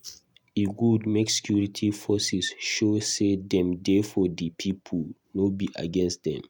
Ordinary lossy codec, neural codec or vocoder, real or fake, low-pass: none; none; real; 19.8 kHz